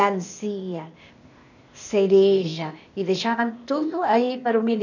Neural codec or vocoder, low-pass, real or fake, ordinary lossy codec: codec, 16 kHz, 0.8 kbps, ZipCodec; 7.2 kHz; fake; none